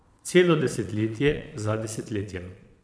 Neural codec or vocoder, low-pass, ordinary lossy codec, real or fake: vocoder, 22.05 kHz, 80 mel bands, Vocos; none; none; fake